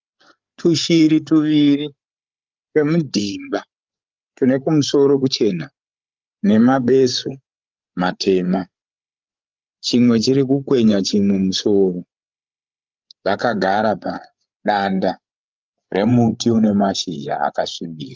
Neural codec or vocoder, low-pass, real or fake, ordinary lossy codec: codec, 16 kHz, 8 kbps, FreqCodec, larger model; 7.2 kHz; fake; Opus, 24 kbps